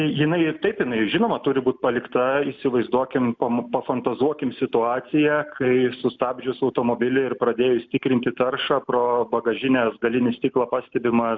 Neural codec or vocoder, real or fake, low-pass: none; real; 7.2 kHz